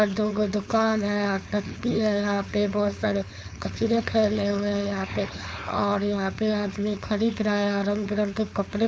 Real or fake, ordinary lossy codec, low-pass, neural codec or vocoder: fake; none; none; codec, 16 kHz, 4.8 kbps, FACodec